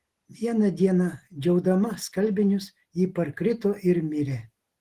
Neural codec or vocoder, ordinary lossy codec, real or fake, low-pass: none; Opus, 16 kbps; real; 14.4 kHz